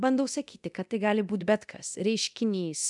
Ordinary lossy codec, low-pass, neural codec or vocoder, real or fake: MP3, 96 kbps; 10.8 kHz; codec, 24 kHz, 0.9 kbps, DualCodec; fake